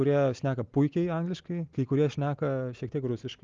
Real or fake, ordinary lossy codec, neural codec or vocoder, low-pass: real; Opus, 32 kbps; none; 7.2 kHz